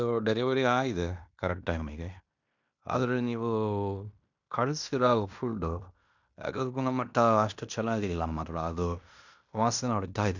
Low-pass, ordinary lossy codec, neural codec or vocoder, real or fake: 7.2 kHz; none; codec, 16 kHz in and 24 kHz out, 0.9 kbps, LongCat-Audio-Codec, fine tuned four codebook decoder; fake